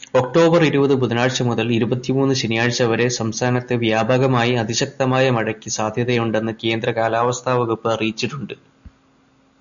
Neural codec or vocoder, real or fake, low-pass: none; real; 7.2 kHz